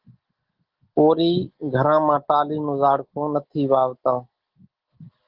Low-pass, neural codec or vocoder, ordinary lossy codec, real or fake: 5.4 kHz; none; Opus, 16 kbps; real